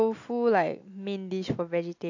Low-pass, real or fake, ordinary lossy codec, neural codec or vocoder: 7.2 kHz; real; AAC, 48 kbps; none